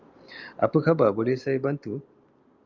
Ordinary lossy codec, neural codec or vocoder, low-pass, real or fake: Opus, 24 kbps; none; 7.2 kHz; real